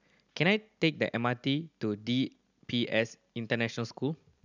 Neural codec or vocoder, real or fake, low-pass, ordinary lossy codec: none; real; 7.2 kHz; none